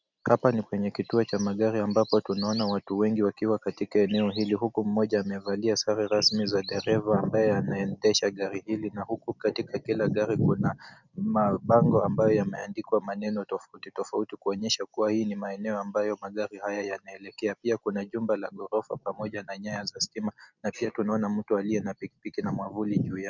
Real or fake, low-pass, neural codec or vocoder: real; 7.2 kHz; none